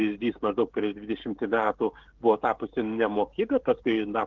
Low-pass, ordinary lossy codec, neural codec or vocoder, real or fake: 7.2 kHz; Opus, 16 kbps; codec, 16 kHz, 16 kbps, FreqCodec, smaller model; fake